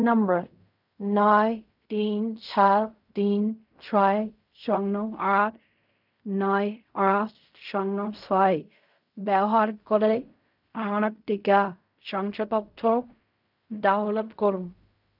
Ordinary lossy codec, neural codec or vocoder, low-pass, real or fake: none; codec, 16 kHz in and 24 kHz out, 0.4 kbps, LongCat-Audio-Codec, fine tuned four codebook decoder; 5.4 kHz; fake